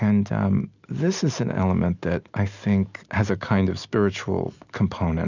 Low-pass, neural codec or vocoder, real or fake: 7.2 kHz; none; real